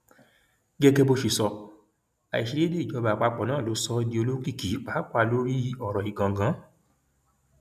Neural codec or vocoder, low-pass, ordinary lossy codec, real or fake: none; 14.4 kHz; none; real